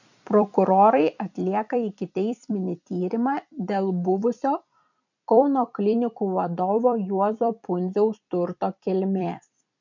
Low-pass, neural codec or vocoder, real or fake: 7.2 kHz; vocoder, 44.1 kHz, 128 mel bands every 256 samples, BigVGAN v2; fake